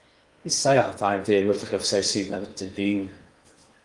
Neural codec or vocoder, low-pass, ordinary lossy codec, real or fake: codec, 16 kHz in and 24 kHz out, 0.8 kbps, FocalCodec, streaming, 65536 codes; 10.8 kHz; Opus, 32 kbps; fake